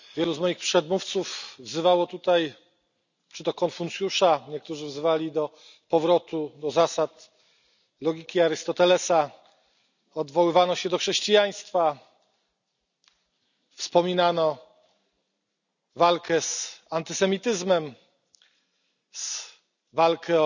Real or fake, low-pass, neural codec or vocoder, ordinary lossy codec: real; 7.2 kHz; none; none